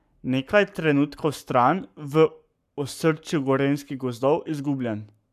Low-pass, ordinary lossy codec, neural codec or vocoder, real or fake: 14.4 kHz; none; codec, 44.1 kHz, 7.8 kbps, Pupu-Codec; fake